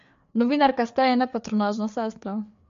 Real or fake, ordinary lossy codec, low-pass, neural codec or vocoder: fake; MP3, 48 kbps; 7.2 kHz; codec, 16 kHz, 4 kbps, FreqCodec, larger model